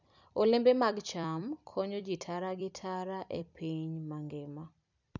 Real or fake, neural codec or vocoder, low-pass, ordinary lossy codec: fake; vocoder, 44.1 kHz, 128 mel bands every 256 samples, BigVGAN v2; 7.2 kHz; none